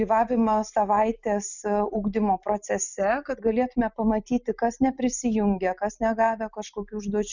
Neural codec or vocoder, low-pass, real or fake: none; 7.2 kHz; real